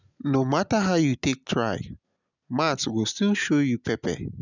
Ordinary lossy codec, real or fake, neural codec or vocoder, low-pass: none; real; none; 7.2 kHz